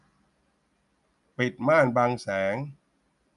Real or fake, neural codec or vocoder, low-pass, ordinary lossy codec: real; none; 10.8 kHz; none